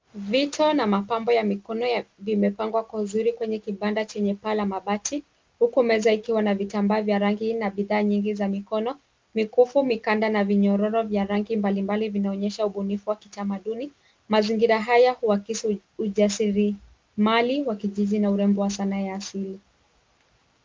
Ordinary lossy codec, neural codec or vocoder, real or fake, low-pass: Opus, 32 kbps; none; real; 7.2 kHz